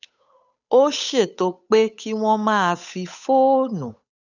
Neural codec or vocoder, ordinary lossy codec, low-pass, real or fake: codec, 16 kHz, 8 kbps, FunCodec, trained on Chinese and English, 25 frames a second; none; 7.2 kHz; fake